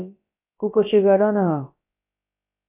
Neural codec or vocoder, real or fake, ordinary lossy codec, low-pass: codec, 16 kHz, about 1 kbps, DyCAST, with the encoder's durations; fake; MP3, 24 kbps; 3.6 kHz